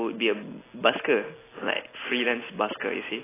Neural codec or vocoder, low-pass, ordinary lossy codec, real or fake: none; 3.6 kHz; AAC, 16 kbps; real